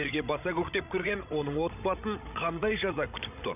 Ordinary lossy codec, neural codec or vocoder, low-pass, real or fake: none; codec, 16 kHz, 16 kbps, FreqCodec, larger model; 3.6 kHz; fake